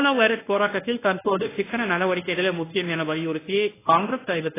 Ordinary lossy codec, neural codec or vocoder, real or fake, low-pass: AAC, 16 kbps; codec, 24 kHz, 0.9 kbps, WavTokenizer, medium speech release version 1; fake; 3.6 kHz